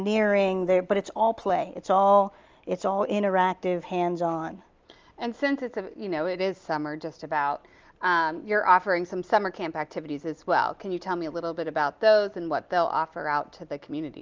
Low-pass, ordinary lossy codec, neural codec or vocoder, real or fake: 7.2 kHz; Opus, 24 kbps; none; real